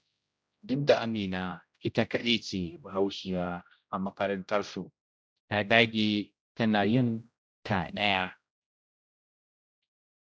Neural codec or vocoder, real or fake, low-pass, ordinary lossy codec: codec, 16 kHz, 0.5 kbps, X-Codec, HuBERT features, trained on general audio; fake; none; none